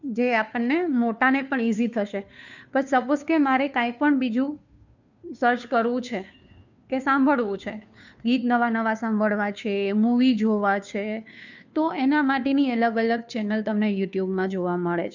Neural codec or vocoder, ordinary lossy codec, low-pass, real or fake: codec, 16 kHz, 2 kbps, FunCodec, trained on Chinese and English, 25 frames a second; none; 7.2 kHz; fake